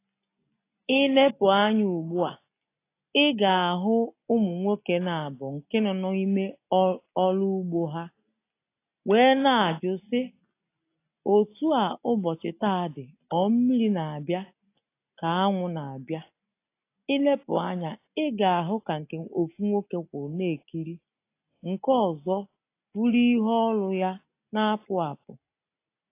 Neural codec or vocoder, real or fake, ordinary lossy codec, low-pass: none; real; AAC, 24 kbps; 3.6 kHz